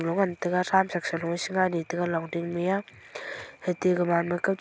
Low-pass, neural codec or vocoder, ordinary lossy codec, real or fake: none; none; none; real